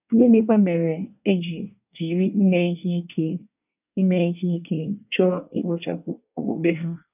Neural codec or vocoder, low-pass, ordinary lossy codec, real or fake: codec, 24 kHz, 1 kbps, SNAC; 3.6 kHz; none; fake